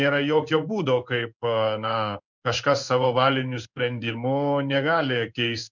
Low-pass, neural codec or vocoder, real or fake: 7.2 kHz; codec, 16 kHz in and 24 kHz out, 1 kbps, XY-Tokenizer; fake